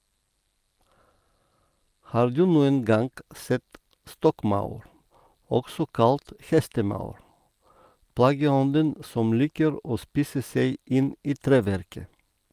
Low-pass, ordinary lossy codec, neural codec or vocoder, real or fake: 14.4 kHz; Opus, 32 kbps; none; real